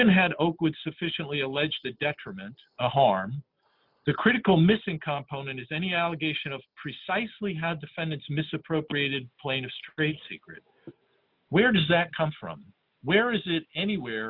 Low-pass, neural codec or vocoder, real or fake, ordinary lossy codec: 5.4 kHz; none; real; Opus, 64 kbps